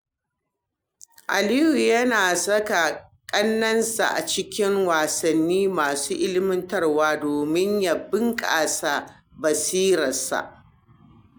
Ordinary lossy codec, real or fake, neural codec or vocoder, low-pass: none; real; none; none